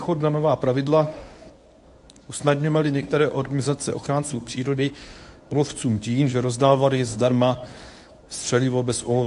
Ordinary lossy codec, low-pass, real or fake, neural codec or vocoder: AAC, 64 kbps; 10.8 kHz; fake; codec, 24 kHz, 0.9 kbps, WavTokenizer, medium speech release version 1